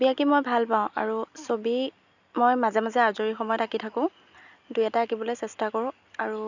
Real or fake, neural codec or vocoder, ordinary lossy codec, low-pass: real; none; none; 7.2 kHz